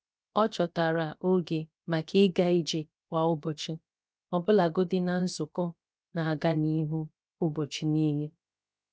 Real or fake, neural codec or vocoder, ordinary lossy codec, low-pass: fake; codec, 16 kHz, about 1 kbps, DyCAST, with the encoder's durations; none; none